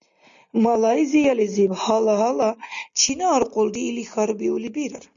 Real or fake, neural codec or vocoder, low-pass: real; none; 7.2 kHz